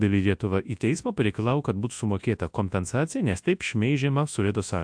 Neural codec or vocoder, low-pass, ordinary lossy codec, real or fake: codec, 24 kHz, 0.9 kbps, WavTokenizer, large speech release; 9.9 kHz; AAC, 64 kbps; fake